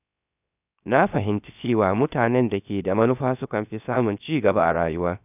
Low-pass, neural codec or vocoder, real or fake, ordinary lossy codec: 3.6 kHz; codec, 16 kHz, 0.7 kbps, FocalCodec; fake; none